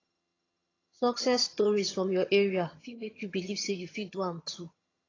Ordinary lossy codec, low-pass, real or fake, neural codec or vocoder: AAC, 32 kbps; 7.2 kHz; fake; vocoder, 22.05 kHz, 80 mel bands, HiFi-GAN